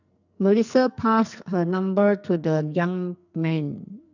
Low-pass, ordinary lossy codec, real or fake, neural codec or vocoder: 7.2 kHz; none; fake; codec, 44.1 kHz, 2.6 kbps, SNAC